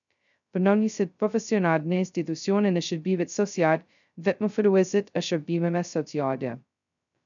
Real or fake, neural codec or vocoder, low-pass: fake; codec, 16 kHz, 0.2 kbps, FocalCodec; 7.2 kHz